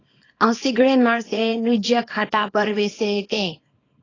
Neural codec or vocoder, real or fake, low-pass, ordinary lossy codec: codec, 24 kHz, 0.9 kbps, WavTokenizer, small release; fake; 7.2 kHz; AAC, 32 kbps